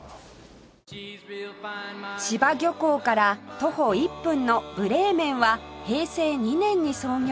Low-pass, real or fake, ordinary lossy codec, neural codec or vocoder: none; real; none; none